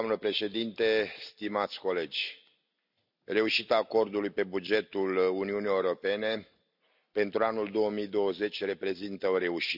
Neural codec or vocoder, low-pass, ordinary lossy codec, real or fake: none; 5.4 kHz; none; real